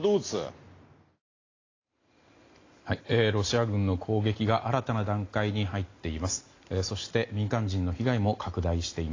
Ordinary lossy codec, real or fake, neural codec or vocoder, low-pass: AAC, 32 kbps; real; none; 7.2 kHz